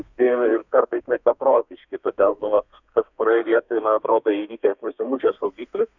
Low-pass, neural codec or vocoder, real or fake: 7.2 kHz; codec, 32 kHz, 1.9 kbps, SNAC; fake